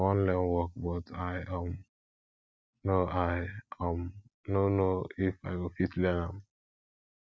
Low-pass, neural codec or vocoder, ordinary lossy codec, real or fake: none; none; none; real